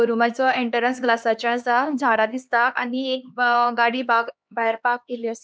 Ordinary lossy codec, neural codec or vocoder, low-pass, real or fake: none; codec, 16 kHz, 2 kbps, X-Codec, HuBERT features, trained on LibriSpeech; none; fake